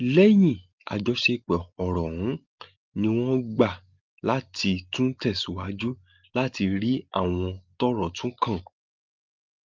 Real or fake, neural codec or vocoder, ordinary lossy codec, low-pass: real; none; Opus, 32 kbps; 7.2 kHz